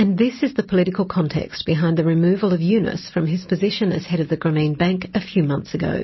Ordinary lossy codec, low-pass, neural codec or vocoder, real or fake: MP3, 24 kbps; 7.2 kHz; none; real